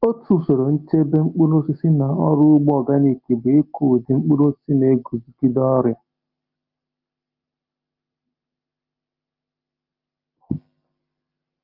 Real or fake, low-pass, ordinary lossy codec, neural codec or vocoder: real; 5.4 kHz; Opus, 24 kbps; none